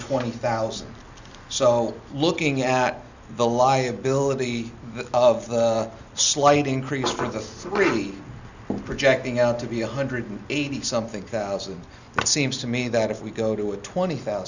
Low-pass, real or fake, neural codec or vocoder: 7.2 kHz; real; none